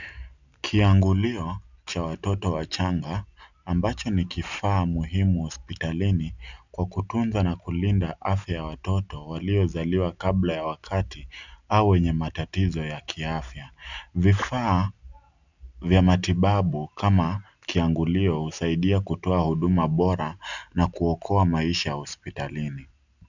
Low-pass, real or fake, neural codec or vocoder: 7.2 kHz; real; none